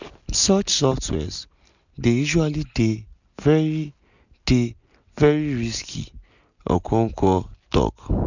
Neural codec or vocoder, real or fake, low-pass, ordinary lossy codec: none; real; 7.2 kHz; none